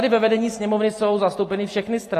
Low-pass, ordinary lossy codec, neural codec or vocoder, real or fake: 14.4 kHz; AAC, 48 kbps; none; real